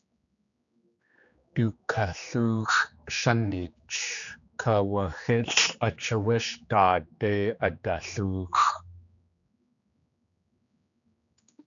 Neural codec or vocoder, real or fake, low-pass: codec, 16 kHz, 2 kbps, X-Codec, HuBERT features, trained on general audio; fake; 7.2 kHz